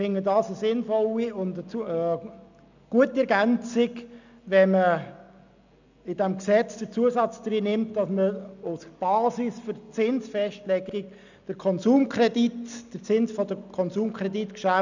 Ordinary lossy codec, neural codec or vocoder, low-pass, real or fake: none; none; 7.2 kHz; real